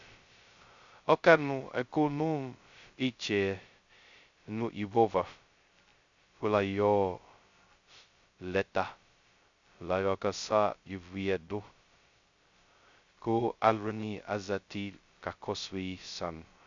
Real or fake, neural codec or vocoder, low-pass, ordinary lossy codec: fake; codec, 16 kHz, 0.2 kbps, FocalCodec; 7.2 kHz; Opus, 64 kbps